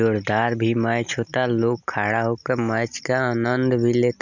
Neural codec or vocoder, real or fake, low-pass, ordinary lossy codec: none; real; 7.2 kHz; none